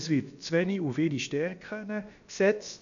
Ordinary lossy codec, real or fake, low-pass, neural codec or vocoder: none; fake; 7.2 kHz; codec, 16 kHz, about 1 kbps, DyCAST, with the encoder's durations